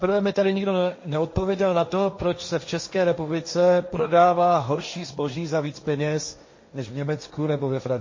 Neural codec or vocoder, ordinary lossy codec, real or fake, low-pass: codec, 16 kHz, 1.1 kbps, Voila-Tokenizer; MP3, 32 kbps; fake; 7.2 kHz